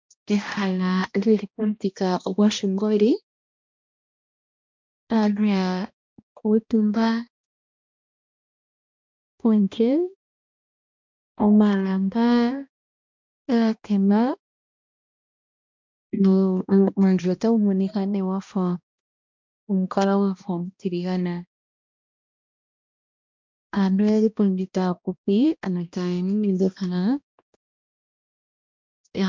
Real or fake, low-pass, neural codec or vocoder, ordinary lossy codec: fake; 7.2 kHz; codec, 16 kHz, 1 kbps, X-Codec, HuBERT features, trained on balanced general audio; MP3, 64 kbps